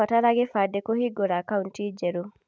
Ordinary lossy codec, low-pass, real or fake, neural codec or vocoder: none; none; real; none